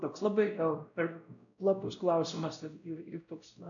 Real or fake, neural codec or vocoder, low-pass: fake; codec, 16 kHz, 0.5 kbps, X-Codec, WavLM features, trained on Multilingual LibriSpeech; 7.2 kHz